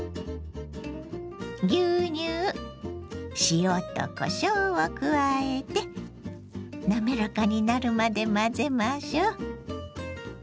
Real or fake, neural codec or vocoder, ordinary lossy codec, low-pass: real; none; none; none